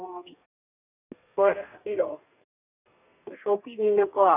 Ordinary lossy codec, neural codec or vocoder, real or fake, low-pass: none; codec, 32 kHz, 1.9 kbps, SNAC; fake; 3.6 kHz